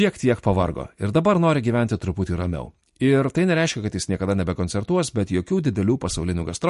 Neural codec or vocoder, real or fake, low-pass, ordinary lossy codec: none; real; 14.4 kHz; MP3, 48 kbps